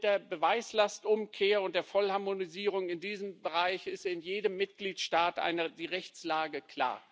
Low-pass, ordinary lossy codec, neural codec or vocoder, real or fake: none; none; none; real